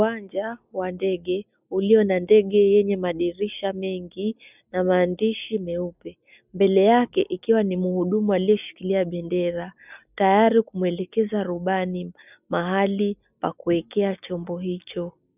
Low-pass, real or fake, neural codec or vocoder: 3.6 kHz; real; none